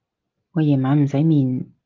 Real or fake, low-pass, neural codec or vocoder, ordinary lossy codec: real; 7.2 kHz; none; Opus, 32 kbps